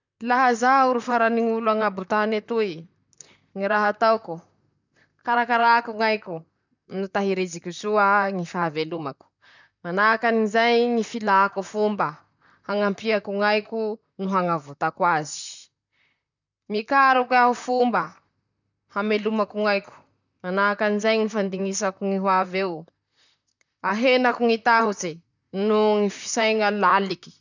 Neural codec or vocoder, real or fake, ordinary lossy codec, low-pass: vocoder, 44.1 kHz, 128 mel bands, Pupu-Vocoder; fake; none; 7.2 kHz